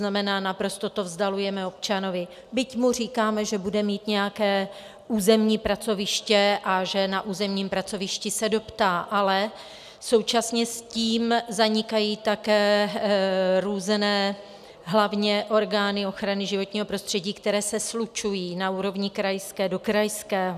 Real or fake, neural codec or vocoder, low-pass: real; none; 14.4 kHz